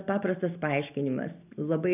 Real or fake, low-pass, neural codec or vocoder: real; 3.6 kHz; none